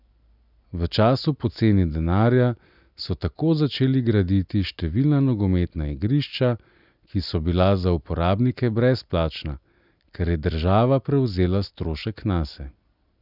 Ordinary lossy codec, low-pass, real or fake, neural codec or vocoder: none; 5.4 kHz; real; none